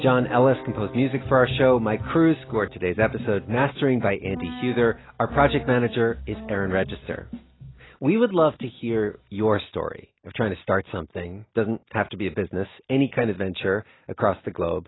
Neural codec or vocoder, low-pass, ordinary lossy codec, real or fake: none; 7.2 kHz; AAC, 16 kbps; real